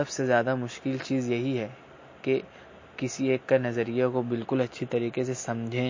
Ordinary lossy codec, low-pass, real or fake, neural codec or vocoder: MP3, 32 kbps; 7.2 kHz; real; none